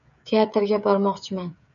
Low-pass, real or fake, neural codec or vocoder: 7.2 kHz; fake; codec, 16 kHz, 16 kbps, FreqCodec, smaller model